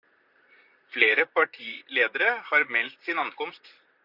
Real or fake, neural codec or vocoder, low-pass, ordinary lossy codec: fake; vocoder, 44.1 kHz, 128 mel bands, Pupu-Vocoder; 5.4 kHz; Opus, 64 kbps